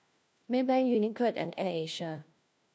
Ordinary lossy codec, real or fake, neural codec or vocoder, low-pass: none; fake; codec, 16 kHz, 1 kbps, FunCodec, trained on LibriTTS, 50 frames a second; none